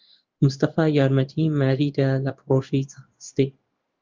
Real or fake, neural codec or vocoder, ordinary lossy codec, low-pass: fake; codec, 16 kHz in and 24 kHz out, 1 kbps, XY-Tokenizer; Opus, 24 kbps; 7.2 kHz